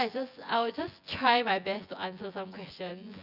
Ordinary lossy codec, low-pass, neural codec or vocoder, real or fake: Opus, 64 kbps; 5.4 kHz; vocoder, 24 kHz, 100 mel bands, Vocos; fake